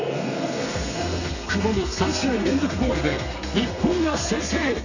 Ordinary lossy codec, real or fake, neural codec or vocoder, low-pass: none; fake; codec, 32 kHz, 1.9 kbps, SNAC; 7.2 kHz